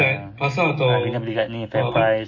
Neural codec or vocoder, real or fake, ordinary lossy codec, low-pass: none; real; MP3, 32 kbps; 7.2 kHz